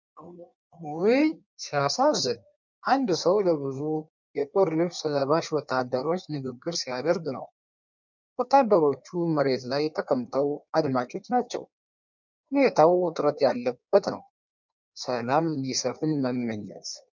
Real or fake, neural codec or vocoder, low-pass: fake; codec, 16 kHz in and 24 kHz out, 1.1 kbps, FireRedTTS-2 codec; 7.2 kHz